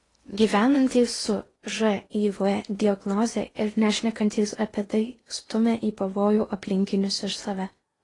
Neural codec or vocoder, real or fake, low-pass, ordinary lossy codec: codec, 16 kHz in and 24 kHz out, 0.8 kbps, FocalCodec, streaming, 65536 codes; fake; 10.8 kHz; AAC, 32 kbps